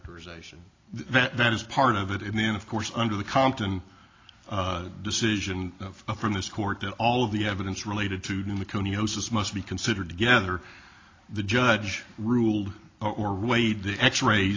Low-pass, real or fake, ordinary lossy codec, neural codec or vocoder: 7.2 kHz; real; AAC, 32 kbps; none